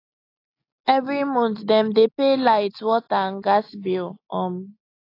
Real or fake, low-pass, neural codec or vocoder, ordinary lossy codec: real; 5.4 kHz; none; AAC, 32 kbps